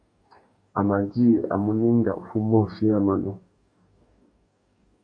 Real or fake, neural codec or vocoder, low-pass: fake; codec, 44.1 kHz, 2.6 kbps, DAC; 9.9 kHz